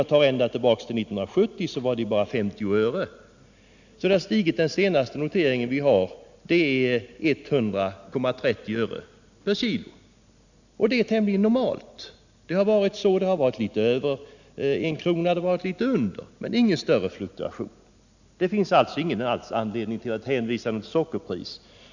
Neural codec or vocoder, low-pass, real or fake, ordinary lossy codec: none; 7.2 kHz; real; none